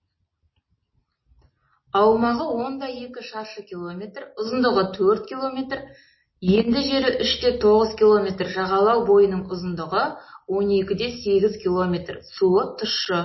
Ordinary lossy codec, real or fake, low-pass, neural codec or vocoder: MP3, 24 kbps; real; 7.2 kHz; none